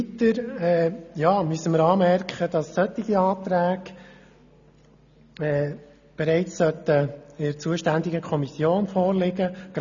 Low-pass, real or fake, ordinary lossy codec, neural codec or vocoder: 7.2 kHz; real; none; none